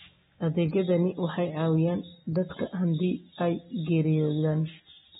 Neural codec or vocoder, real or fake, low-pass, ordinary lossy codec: none; real; 19.8 kHz; AAC, 16 kbps